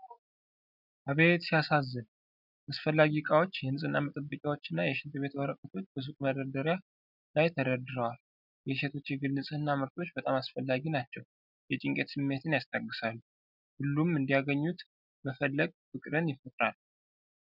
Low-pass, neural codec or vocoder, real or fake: 5.4 kHz; none; real